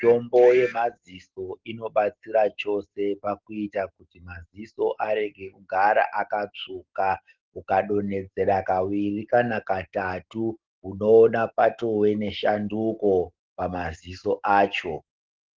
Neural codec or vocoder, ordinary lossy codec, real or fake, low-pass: none; Opus, 16 kbps; real; 7.2 kHz